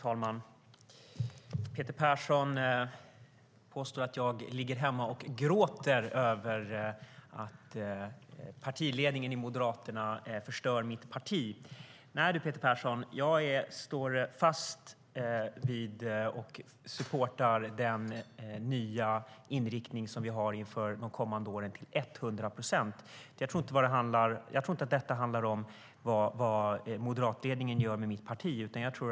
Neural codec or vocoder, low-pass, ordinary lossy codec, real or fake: none; none; none; real